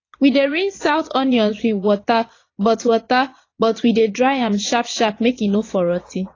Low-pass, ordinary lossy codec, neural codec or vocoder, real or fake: 7.2 kHz; AAC, 32 kbps; vocoder, 22.05 kHz, 80 mel bands, Vocos; fake